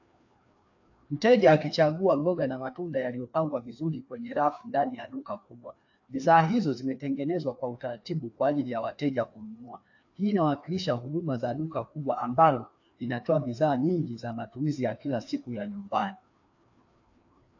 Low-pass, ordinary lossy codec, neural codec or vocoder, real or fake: 7.2 kHz; AAC, 48 kbps; codec, 16 kHz, 2 kbps, FreqCodec, larger model; fake